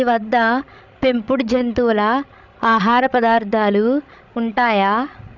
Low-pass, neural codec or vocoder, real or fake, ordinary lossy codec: 7.2 kHz; codec, 16 kHz, 16 kbps, FreqCodec, larger model; fake; none